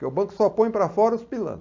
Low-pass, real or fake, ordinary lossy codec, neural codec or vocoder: 7.2 kHz; real; MP3, 48 kbps; none